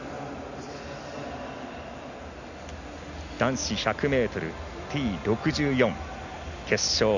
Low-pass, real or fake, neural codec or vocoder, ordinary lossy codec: 7.2 kHz; real; none; none